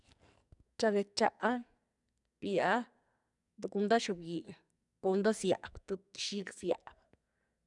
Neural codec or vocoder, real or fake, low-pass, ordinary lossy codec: codec, 44.1 kHz, 2.6 kbps, SNAC; fake; 10.8 kHz; none